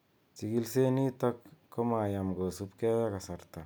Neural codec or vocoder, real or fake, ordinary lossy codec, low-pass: none; real; none; none